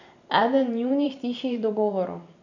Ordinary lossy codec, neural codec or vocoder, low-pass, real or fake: none; vocoder, 44.1 kHz, 128 mel bands every 512 samples, BigVGAN v2; 7.2 kHz; fake